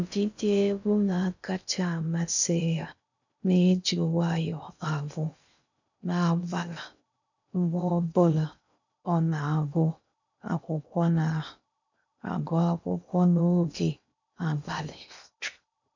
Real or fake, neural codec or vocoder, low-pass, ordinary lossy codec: fake; codec, 16 kHz in and 24 kHz out, 0.6 kbps, FocalCodec, streaming, 2048 codes; 7.2 kHz; none